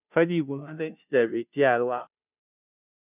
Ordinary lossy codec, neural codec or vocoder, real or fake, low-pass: none; codec, 16 kHz, 0.5 kbps, FunCodec, trained on Chinese and English, 25 frames a second; fake; 3.6 kHz